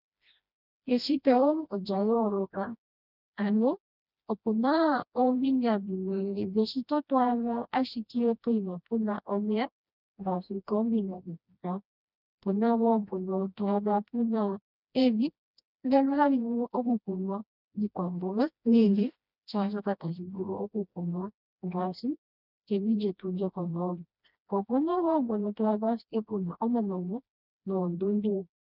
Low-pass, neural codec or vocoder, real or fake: 5.4 kHz; codec, 16 kHz, 1 kbps, FreqCodec, smaller model; fake